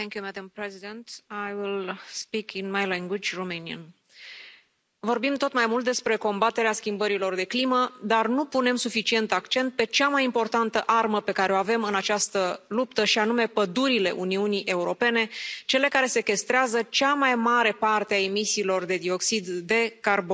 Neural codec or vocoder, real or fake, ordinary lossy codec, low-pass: none; real; none; none